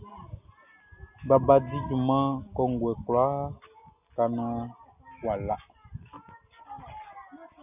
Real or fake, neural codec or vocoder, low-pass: real; none; 3.6 kHz